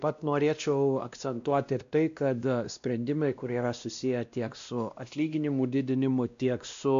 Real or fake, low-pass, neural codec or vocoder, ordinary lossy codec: fake; 7.2 kHz; codec, 16 kHz, 1 kbps, X-Codec, WavLM features, trained on Multilingual LibriSpeech; AAC, 64 kbps